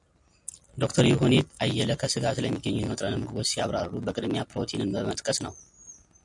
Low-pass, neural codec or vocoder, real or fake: 10.8 kHz; none; real